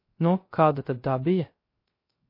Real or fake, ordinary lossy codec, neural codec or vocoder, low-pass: fake; MP3, 32 kbps; codec, 16 kHz, 0.3 kbps, FocalCodec; 5.4 kHz